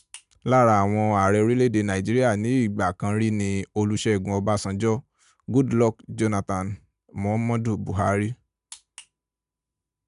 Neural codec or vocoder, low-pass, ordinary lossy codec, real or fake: none; 10.8 kHz; none; real